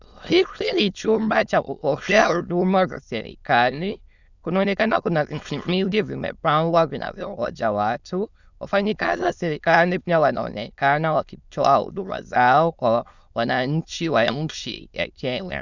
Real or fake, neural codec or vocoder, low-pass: fake; autoencoder, 22.05 kHz, a latent of 192 numbers a frame, VITS, trained on many speakers; 7.2 kHz